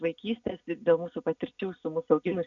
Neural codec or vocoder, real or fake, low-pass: none; real; 7.2 kHz